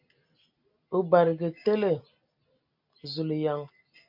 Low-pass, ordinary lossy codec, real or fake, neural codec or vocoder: 5.4 kHz; MP3, 48 kbps; real; none